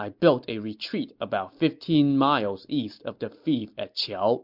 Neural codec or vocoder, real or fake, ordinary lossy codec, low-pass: none; real; MP3, 48 kbps; 5.4 kHz